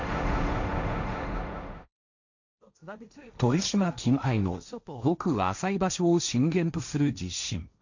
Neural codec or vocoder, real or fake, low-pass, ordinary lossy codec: codec, 16 kHz, 1.1 kbps, Voila-Tokenizer; fake; 7.2 kHz; none